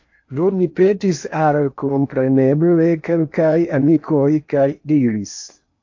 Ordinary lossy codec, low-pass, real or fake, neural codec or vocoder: AAC, 48 kbps; 7.2 kHz; fake; codec, 16 kHz in and 24 kHz out, 0.8 kbps, FocalCodec, streaming, 65536 codes